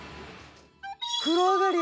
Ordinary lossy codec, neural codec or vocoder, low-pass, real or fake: none; none; none; real